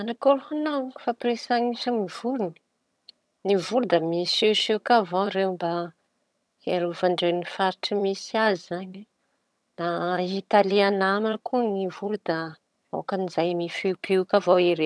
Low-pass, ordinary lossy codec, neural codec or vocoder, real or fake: none; none; vocoder, 22.05 kHz, 80 mel bands, HiFi-GAN; fake